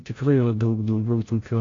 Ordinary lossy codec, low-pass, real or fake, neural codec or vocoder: AAC, 32 kbps; 7.2 kHz; fake; codec, 16 kHz, 0.5 kbps, FreqCodec, larger model